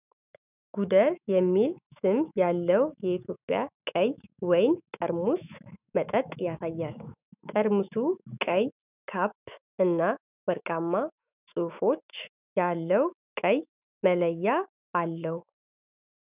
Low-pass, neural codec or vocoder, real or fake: 3.6 kHz; autoencoder, 48 kHz, 128 numbers a frame, DAC-VAE, trained on Japanese speech; fake